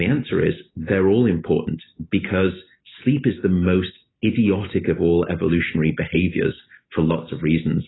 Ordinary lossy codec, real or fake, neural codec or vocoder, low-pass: AAC, 16 kbps; real; none; 7.2 kHz